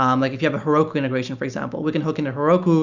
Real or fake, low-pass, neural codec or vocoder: real; 7.2 kHz; none